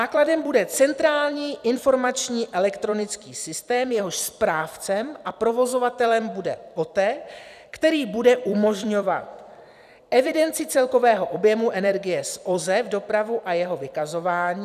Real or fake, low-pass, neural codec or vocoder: fake; 14.4 kHz; vocoder, 44.1 kHz, 128 mel bands every 256 samples, BigVGAN v2